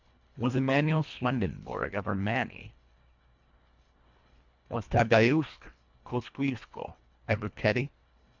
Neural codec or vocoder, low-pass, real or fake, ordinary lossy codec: codec, 24 kHz, 1.5 kbps, HILCodec; 7.2 kHz; fake; MP3, 64 kbps